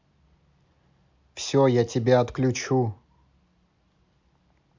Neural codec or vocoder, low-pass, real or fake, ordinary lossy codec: none; 7.2 kHz; real; MP3, 64 kbps